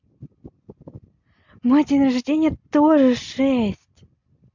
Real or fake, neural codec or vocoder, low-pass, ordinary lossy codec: real; none; 7.2 kHz; MP3, 64 kbps